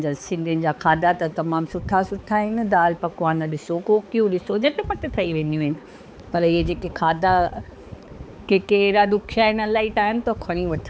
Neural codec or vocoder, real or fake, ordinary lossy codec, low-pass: codec, 16 kHz, 4 kbps, X-Codec, HuBERT features, trained on balanced general audio; fake; none; none